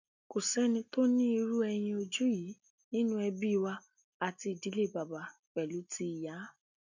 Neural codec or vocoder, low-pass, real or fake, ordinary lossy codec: none; 7.2 kHz; real; none